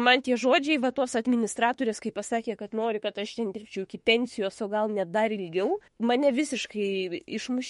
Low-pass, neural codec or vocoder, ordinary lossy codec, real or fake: 19.8 kHz; autoencoder, 48 kHz, 32 numbers a frame, DAC-VAE, trained on Japanese speech; MP3, 48 kbps; fake